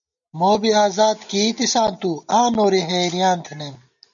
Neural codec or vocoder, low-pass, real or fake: none; 7.2 kHz; real